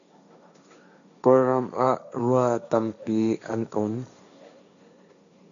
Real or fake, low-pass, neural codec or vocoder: fake; 7.2 kHz; codec, 16 kHz, 1.1 kbps, Voila-Tokenizer